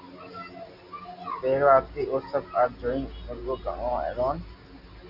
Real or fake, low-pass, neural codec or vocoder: real; 5.4 kHz; none